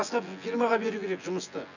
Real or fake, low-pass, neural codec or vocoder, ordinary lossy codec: fake; 7.2 kHz; vocoder, 24 kHz, 100 mel bands, Vocos; none